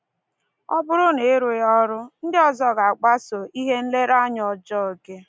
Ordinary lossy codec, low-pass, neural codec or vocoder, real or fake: none; none; none; real